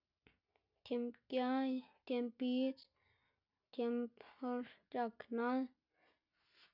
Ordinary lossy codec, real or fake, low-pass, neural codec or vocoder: none; real; 5.4 kHz; none